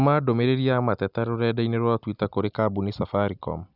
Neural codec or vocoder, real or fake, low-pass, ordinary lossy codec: none; real; 5.4 kHz; none